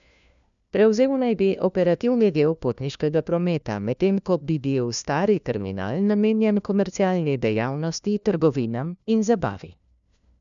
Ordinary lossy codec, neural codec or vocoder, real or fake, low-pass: none; codec, 16 kHz, 1 kbps, FunCodec, trained on LibriTTS, 50 frames a second; fake; 7.2 kHz